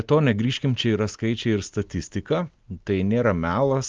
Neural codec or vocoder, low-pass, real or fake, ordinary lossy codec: none; 7.2 kHz; real; Opus, 32 kbps